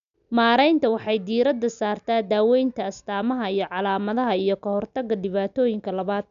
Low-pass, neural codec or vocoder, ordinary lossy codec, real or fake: 7.2 kHz; none; none; real